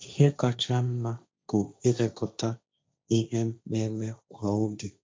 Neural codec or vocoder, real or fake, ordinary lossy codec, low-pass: codec, 16 kHz, 1.1 kbps, Voila-Tokenizer; fake; none; none